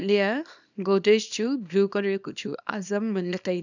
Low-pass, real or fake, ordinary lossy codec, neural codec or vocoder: 7.2 kHz; fake; none; codec, 24 kHz, 0.9 kbps, WavTokenizer, small release